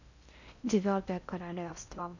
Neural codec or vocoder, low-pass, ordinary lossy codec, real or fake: codec, 16 kHz in and 24 kHz out, 0.6 kbps, FocalCodec, streaming, 4096 codes; 7.2 kHz; none; fake